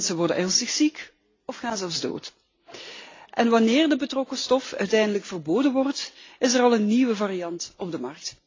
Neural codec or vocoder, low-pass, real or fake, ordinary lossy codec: none; 7.2 kHz; real; AAC, 32 kbps